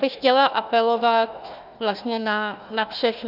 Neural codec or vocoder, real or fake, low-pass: codec, 16 kHz, 1 kbps, FunCodec, trained on Chinese and English, 50 frames a second; fake; 5.4 kHz